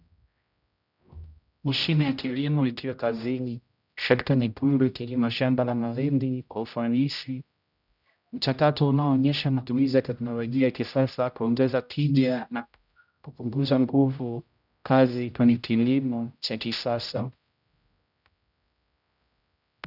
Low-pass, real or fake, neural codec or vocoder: 5.4 kHz; fake; codec, 16 kHz, 0.5 kbps, X-Codec, HuBERT features, trained on general audio